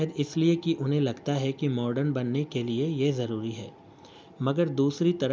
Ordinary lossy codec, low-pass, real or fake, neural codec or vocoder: none; none; real; none